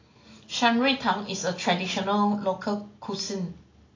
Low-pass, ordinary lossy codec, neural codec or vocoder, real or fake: 7.2 kHz; AAC, 32 kbps; none; real